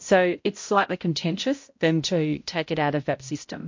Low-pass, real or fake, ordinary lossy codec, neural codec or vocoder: 7.2 kHz; fake; MP3, 48 kbps; codec, 16 kHz, 0.5 kbps, X-Codec, HuBERT features, trained on balanced general audio